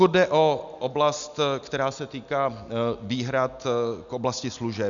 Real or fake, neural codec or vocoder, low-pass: real; none; 7.2 kHz